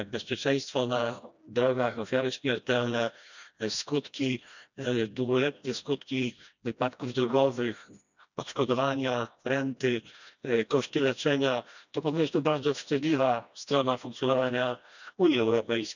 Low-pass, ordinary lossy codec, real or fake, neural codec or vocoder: 7.2 kHz; none; fake; codec, 16 kHz, 1 kbps, FreqCodec, smaller model